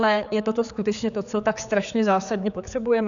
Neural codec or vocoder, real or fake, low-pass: codec, 16 kHz, 4 kbps, X-Codec, HuBERT features, trained on general audio; fake; 7.2 kHz